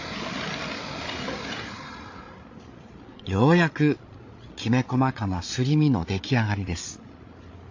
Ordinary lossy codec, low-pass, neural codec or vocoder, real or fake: AAC, 48 kbps; 7.2 kHz; codec, 16 kHz, 8 kbps, FreqCodec, larger model; fake